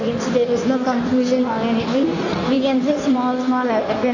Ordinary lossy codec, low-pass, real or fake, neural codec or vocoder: AAC, 48 kbps; 7.2 kHz; fake; codec, 16 kHz in and 24 kHz out, 1.1 kbps, FireRedTTS-2 codec